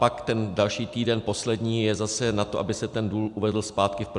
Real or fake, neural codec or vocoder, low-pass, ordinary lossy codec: real; none; 10.8 kHz; MP3, 96 kbps